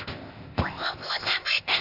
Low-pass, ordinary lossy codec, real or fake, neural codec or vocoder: 5.4 kHz; none; fake; codec, 16 kHz, 0.8 kbps, ZipCodec